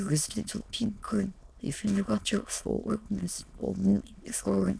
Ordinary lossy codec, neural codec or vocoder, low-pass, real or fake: none; autoencoder, 22.05 kHz, a latent of 192 numbers a frame, VITS, trained on many speakers; none; fake